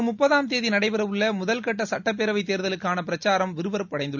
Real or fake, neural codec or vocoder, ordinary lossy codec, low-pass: real; none; none; 7.2 kHz